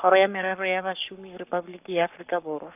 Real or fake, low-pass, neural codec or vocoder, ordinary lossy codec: fake; 3.6 kHz; codec, 44.1 kHz, 7.8 kbps, Pupu-Codec; none